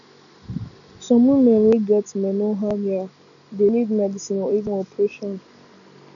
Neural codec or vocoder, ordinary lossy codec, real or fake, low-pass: none; none; real; 7.2 kHz